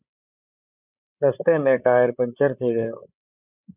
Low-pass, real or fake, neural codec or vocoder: 3.6 kHz; fake; codec, 16 kHz, 16 kbps, FreqCodec, larger model